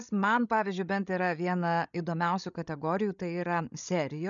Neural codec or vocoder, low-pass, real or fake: codec, 16 kHz, 16 kbps, FreqCodec, larger model; 7.2 kHz; fake